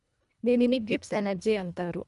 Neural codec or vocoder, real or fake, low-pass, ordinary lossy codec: codec, 24 kHz, 1.5 kbps, HILCodec; fake; 10.8 kHz; none